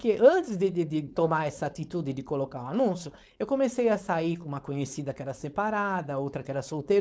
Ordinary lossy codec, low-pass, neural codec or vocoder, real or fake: none; none; codec, 16 kHz, 4.8 kbps, FACodec; fake